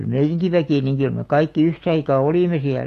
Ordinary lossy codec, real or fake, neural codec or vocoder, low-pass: AAC, 48 kbps; fake; autoencoder, 48 kHz, 128 numbers a frame, DAC-VAE, trained on Japanese speech; 14.4 kHz